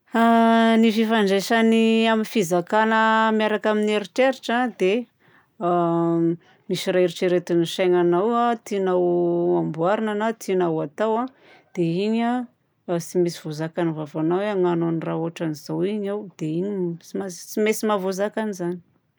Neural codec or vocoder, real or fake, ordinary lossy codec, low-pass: none; real; none; none